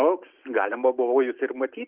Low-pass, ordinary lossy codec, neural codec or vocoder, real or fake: 3.6 kHz; Opus, 24 kbps; none; real